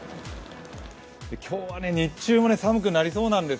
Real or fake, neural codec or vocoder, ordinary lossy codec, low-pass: real; none; none; none